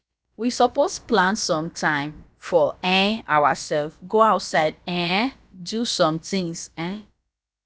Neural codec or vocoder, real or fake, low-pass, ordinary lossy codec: codec, 16 kHz, about 1 kbps, DyCAST, with the encoder's durations; fake; none; none